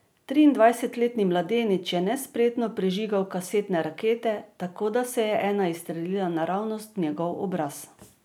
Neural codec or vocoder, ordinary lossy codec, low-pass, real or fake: none; none; none; real